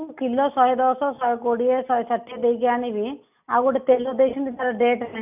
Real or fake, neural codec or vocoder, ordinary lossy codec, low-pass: real; none; none; 3.6 kHz